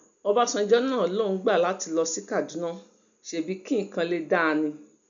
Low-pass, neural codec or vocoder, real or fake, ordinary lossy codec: 7.2 kHz; none; real; MP3, 96 kbps